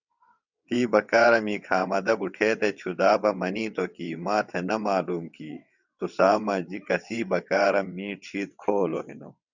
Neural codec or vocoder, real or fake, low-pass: vocoder, 22.05 kHz, 80 mel bands, WaveNeXt; fake; 7.2 kHz